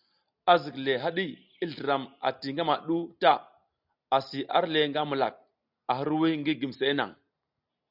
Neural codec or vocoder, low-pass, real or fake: none; 5.4 kHz; real